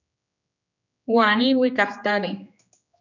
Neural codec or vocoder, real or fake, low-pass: codec, 16 kHz, 2 kbps, X-Codec, HuBERT features, trained on general audio; fake; 7.2 kHz